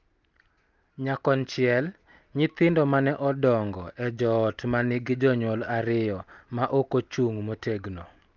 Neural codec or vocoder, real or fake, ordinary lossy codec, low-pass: none; real; Opus, 32 kbps; 7.2 kHz